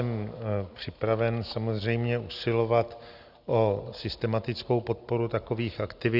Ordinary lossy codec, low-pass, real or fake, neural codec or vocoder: Opus, 64 kbps; 5.4 kHz; real; none